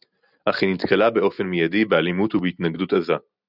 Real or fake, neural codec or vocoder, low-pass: real; none; 5.4 kHz